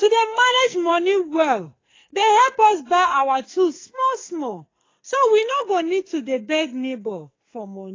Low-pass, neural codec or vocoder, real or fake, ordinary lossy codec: 7.2 kHz; codec, 44.1 kHz, 2.6 kbps, SNAC; fake; AAC, 32 kbps